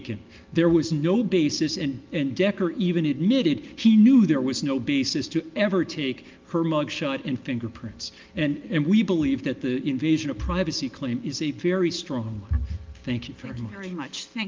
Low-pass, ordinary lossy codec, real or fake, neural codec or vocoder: 7.2 kHz; Opus, 32 kbps; real; none